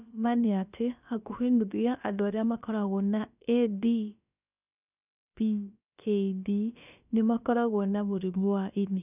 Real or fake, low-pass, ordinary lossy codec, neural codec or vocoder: fake; 3.6 kHz; none; codec, 16 kHz, about 1 kbps, DyCAST, with the encoder's durations